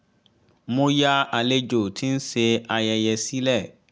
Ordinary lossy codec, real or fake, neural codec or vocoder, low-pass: none; real; none; none